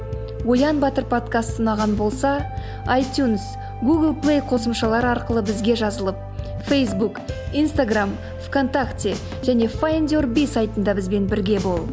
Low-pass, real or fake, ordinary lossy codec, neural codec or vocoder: none; real; none; none